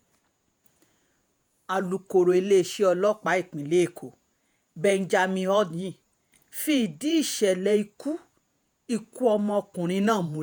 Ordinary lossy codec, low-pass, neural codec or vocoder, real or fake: none; none; vocoder, 48 kHz, 128 mel bands, Vocos; fake